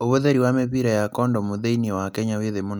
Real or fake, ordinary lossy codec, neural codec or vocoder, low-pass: real; none; none; none